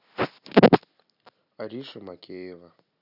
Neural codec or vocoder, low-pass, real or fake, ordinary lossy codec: none; 5.4 kHz; real; none